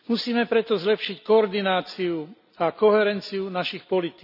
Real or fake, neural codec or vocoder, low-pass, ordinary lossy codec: real; none; 5.4 kHz; none